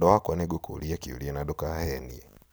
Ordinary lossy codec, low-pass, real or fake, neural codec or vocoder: none; none; real; none